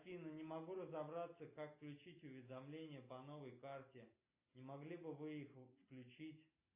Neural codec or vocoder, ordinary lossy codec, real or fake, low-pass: none; AAC, 32 kbps; real; 3.6 kHz